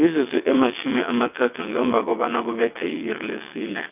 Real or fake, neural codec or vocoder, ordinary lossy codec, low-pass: fake; vocoder, 22.05 kHz, 80 mel bands, WaveNeXt; none; 3.6 kHz